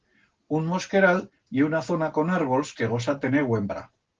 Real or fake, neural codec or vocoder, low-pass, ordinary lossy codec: real; none; 7.2 kHz; Opus, 16 kbps